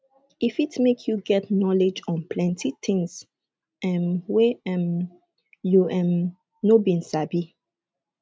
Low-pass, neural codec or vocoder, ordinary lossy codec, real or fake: none; none; none; real